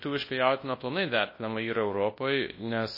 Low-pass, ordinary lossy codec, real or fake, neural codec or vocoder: 5.4 kHz; MP3, 24 kbps; fake; codec, 24 kHz, 0.9 kbps, WavTokenizer, large speech release